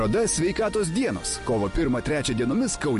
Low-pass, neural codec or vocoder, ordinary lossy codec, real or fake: 14.4 kHz; none; MP3, 48 kbps; real